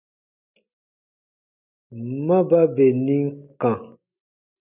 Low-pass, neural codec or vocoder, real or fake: 3.6 kHz; none; real